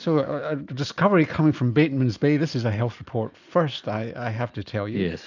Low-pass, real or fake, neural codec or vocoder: 7.2 kHz; real; none